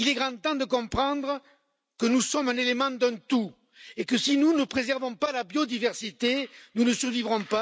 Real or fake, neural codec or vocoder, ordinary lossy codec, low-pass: real; none; none; none